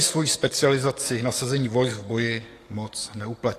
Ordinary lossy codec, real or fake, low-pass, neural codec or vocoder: AAC, 48 kbps; fake; 14.4 kHz; codec, 44.1 kHz, 7.8 kbps, DAC